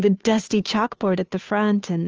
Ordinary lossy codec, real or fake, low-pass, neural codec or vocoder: Opus, 24 kbps; fake; 7.2 kHz; codec, 16 kHz, 2 kbps, FunCodec, trained on Chinese and English, 25 frames a second